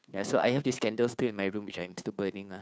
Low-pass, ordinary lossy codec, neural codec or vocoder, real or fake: none; none; codec, 16 kHz, 2 kbps, FunCodec, trained on Chinese and English, 25 frames a second; fake